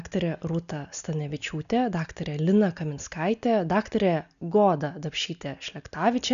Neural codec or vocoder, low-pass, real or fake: none; 7.2 kHz; real